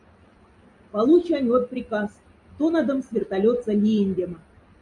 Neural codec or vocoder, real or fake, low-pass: none; real; 10.8 kHz